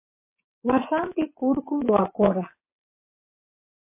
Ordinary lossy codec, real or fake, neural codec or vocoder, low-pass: MP3, 24 kbps; fake; vocoder, 22.05 kHz, 80 mel bands, WaveNeXt; 3.6 kHz